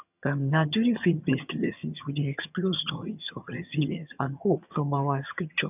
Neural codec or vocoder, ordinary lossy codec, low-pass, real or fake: vocoder, 22.05 kHz, 80 mel bands, HiFi-GAN; none; 3.6 kHz; fake